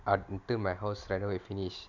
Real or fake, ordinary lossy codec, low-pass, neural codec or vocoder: real; none; 7.2 kHz; none